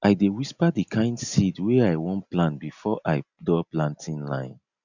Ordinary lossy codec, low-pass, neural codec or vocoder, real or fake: none; 7.2 kHz; none; real